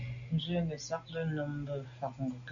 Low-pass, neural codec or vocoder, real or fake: 7.2 kHz; none; real